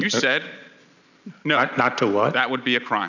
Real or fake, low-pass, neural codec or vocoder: real; 7.2 kHz; none